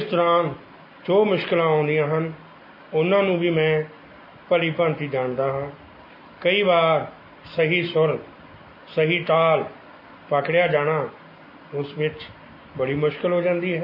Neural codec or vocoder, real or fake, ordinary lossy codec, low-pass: none; real; MP3, 24 kbps; 5.4 kHz